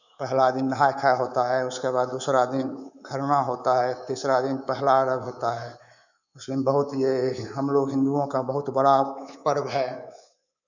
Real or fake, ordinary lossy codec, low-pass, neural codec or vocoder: fake; none; 7.2 kHz; codec, 24 kHz, 3.1 kbps, DualCodec